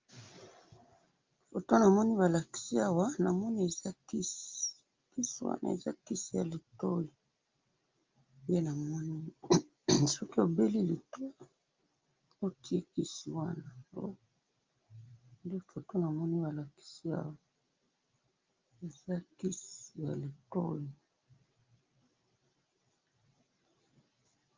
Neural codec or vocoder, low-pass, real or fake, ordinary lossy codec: none; 7.2 kHz; real; Opus, 24 kbps